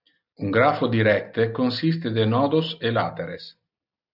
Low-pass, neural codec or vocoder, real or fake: 5.4 kHz; none; real